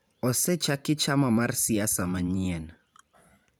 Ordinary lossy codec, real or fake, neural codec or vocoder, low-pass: none; fake; vocoder, 44.1 kHz, 128 mel bands every 512 samples, BigVGAN v2; none